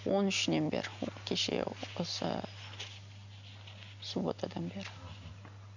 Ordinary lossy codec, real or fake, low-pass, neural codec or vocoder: none; real; 7.2 kHz; none